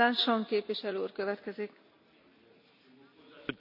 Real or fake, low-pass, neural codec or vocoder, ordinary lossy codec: real; 5.4 kHz; none; none